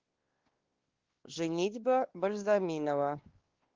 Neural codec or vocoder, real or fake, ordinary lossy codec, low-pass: codec, 16 kHz, 2 kbps, X-Codec, WavLM features, trained on Multilingual LibriSpeech; fake; Opus, 16 kbps; 7.2 kHz